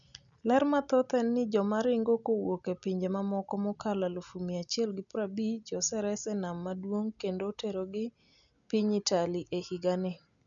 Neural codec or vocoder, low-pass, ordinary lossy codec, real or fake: none; 7.2 kHz; none; real